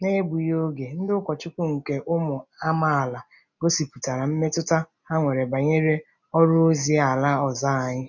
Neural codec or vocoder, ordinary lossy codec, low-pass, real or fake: none; none; 7.2 kHz; real